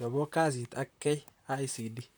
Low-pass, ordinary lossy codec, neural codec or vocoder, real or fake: none; none; vocoder, 44.1 kHz, 128 mel bands, Pupu-Vocoder; fake